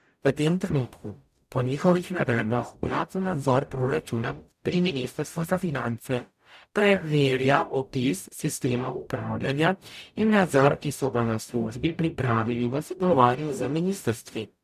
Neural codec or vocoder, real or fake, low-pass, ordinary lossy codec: codec, 44.1 kHz, 0.9 kbps, DAC; fake; 14.4 kHz; none